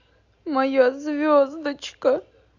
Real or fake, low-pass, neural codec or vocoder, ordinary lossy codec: real; 7.2 kHz; none; AAC, 48 kbps